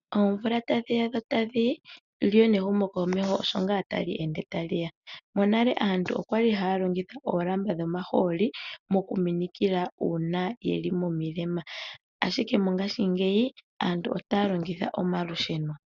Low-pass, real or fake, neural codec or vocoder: 7.2 kHz; real; none